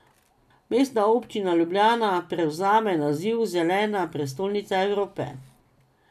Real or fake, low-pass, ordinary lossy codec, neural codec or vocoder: real; 14.4 kHz; none; none